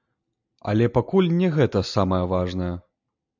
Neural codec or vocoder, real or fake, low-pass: none; real; 7.2 kHz